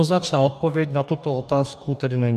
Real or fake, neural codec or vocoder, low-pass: fake; codec, 44.1 kHz, 2.6 kbps, DAC; 14.4 kHz